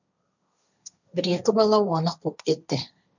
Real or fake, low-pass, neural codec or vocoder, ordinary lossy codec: fake; 7.2 kHz; codec, 16 kHz, 1.1 kbps, Voila-Tokenizer; none